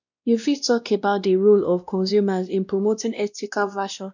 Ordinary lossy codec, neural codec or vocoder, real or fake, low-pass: none; codec, 16 kHz, 1 kbps, X-Codec, WavLM features, trained on Multilingual LibriSpeech; fake; 7.2 kHz